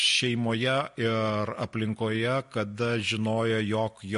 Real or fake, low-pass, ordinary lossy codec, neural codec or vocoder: real; 14.4 kHz; MP3, 48 kbps; none